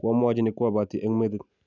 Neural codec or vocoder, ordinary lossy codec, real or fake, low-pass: none; none; real; 7.2 kHz